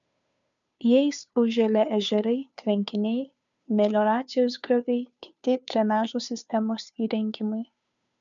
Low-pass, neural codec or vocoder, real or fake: 7.2 kHz; codec, 16 kHz, 2 kbps, FunCodec, trained on Chinese and English, 25 frames a second; fake